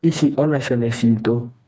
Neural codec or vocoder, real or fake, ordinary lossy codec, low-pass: codec, 16 kHz, 2 kbps, FreqCodec, smaller model; fake; none; none